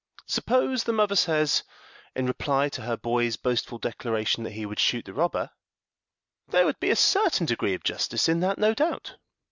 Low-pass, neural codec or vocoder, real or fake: 7.2 kHz; none; real